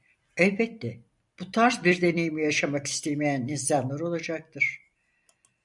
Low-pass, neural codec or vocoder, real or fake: 10.8 kHz; vocoder, 44.1 kHz, 128 mel bands every 256 samples, BigVGAN v2; fake